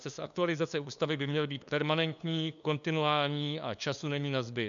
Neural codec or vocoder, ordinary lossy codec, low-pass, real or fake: codec, 16 kHz, 2 kbps, FunCodec, trained on LibriTTS, 25 frames a second; MP3, 96 kbps; 7.2 kHz; fake